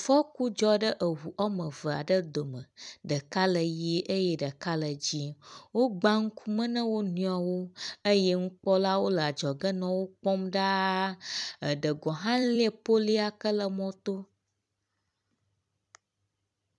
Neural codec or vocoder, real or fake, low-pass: vocoder, 44.1 kHz, 128 mel bands every 256 samples, BigVGAN v2; fake; 10.8 kHz